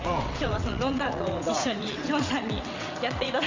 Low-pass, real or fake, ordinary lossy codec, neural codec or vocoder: 7.2 kHz; fake; MP3, 64 kbps; vocoder, 22.05 kHz, 80 mel bands, WaveNeXt